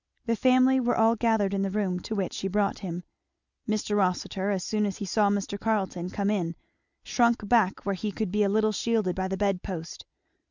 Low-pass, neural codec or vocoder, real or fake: 7.2 kHz; none; real